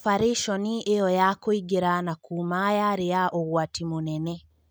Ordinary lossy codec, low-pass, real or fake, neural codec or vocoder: none; none; real; none